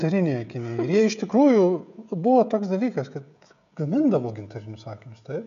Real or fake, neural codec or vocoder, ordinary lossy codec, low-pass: fake; codec, 16 kHz, 16 kbps, FreqCodec, smaller model; MP3, 96 kbps; 7.2 kHz